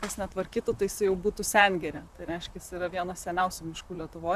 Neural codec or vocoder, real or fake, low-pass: vocoder, 44.1 kHz, 128 mel bands, Pupu-Vocoder; fake; 14.4 kHz